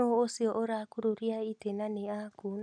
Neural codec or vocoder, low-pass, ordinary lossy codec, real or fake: none; 9.9 kHz; none; real